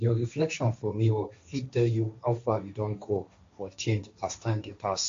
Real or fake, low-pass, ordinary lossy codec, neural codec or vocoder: fake; 7.2 kHz; MP3, 48 kbps; codec, 16 kHz, 1.1 kbps, Voila-Tokenizer